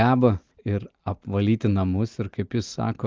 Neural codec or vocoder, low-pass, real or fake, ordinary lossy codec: none; 7.2 kHz; real; Opus, 32 kbps